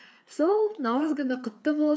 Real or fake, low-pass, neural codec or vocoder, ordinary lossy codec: fake; none; codec, 16 kHz, 4 kbps, FreqCodec, larger model; none